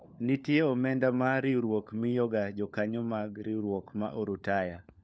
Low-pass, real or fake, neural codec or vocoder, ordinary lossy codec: none; fake; codec, 16 kHz, 4 kbps, FunCodec, trained on LibriTTS, 50 frames a second; none